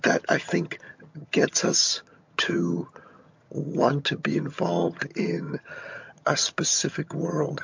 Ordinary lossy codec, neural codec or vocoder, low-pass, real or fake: MP3, 48 kbps; vocoder, 22.05 kHz, 80 mel bands, HiFi-GAN; 7.2 kHz; fake